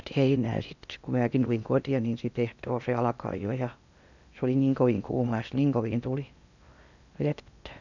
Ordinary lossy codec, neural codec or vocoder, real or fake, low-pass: none; codec, 16 kHz in and 24 kHz out, 0.8 kbps, FocalCodec, streaming, 65536 codes; fake; 7.2 kHz